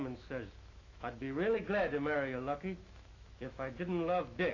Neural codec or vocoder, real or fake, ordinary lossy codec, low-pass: autoencoder, 48 kHz, 128 numbers a frame, DAC-VAE, trained on Japanese speech; fake; AAC, 32 kbps; 7.2 kHz